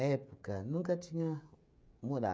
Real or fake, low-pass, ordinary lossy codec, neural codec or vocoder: fake; none; none; codec, 16 kHz, 16 kbps, FreqCodec, smaller model